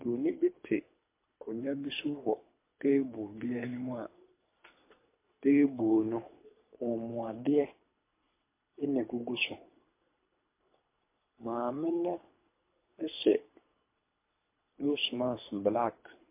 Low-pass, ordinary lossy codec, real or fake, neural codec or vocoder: 3.6 kHz; MP3, 24 kbps; fake; codec, 24 kHz, 3 kbps, HILCodec